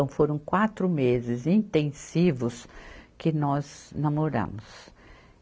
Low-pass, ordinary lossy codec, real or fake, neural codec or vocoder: none; none; real; none